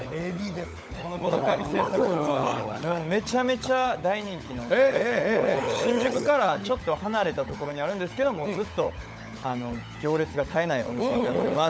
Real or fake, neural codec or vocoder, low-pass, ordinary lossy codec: fake; codec, 16 kHz, 16 kbps, FunCodec, trained on LibriTTS, 50 frames a second; none; none